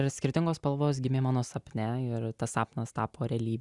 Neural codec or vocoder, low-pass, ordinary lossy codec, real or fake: none; 10.8 kHz; Opus, 64 kbps; real